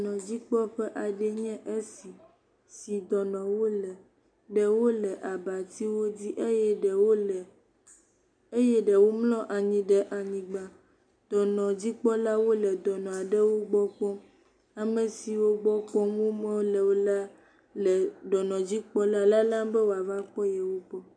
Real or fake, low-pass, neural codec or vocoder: real; 9.9 kHz; none